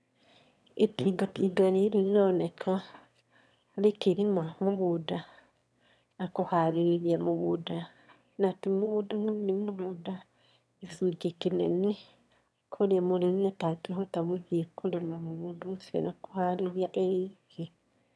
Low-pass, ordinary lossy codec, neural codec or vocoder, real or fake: none; none; autoencoder, 22.05 kHz, a latent of 192 numbers a frame, VITS, trained on one speaker; fake